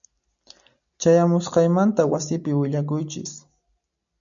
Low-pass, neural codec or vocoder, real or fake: 7.2 kHz; none; real